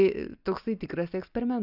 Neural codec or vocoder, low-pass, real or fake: none; 5.4 kHz; real